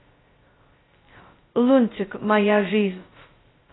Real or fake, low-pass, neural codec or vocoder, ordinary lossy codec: fake; 7.2 kHz; codec, 16 kHz, 0.2 kbps, FocalCodec; AAC, 16 kbps